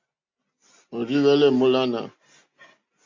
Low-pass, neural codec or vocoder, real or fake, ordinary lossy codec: 7.2 kHz; none; real; MP3, 64 kbps